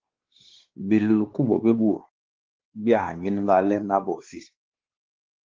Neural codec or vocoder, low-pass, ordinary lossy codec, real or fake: codec, 16 kHz, 2 kbps, X-Codec, WavLM features, trained on Multilingual LibriSpeech; 7.2 kHz; Opus, 16 kbps; fake